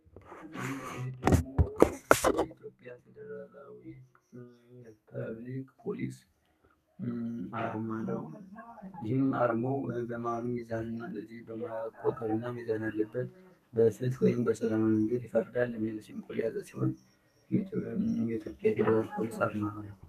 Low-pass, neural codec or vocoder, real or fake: 14.4 kHz; codec, 32 kHz, 1.9 kbps, SNAC; fake